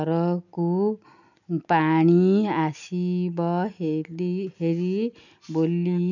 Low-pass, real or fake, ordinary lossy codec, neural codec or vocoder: 7.2 kHz; real; none; none